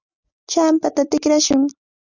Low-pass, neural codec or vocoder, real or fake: 7.2 kHz; none; real